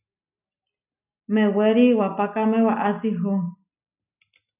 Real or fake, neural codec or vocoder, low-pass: real; none; 3.6 kHz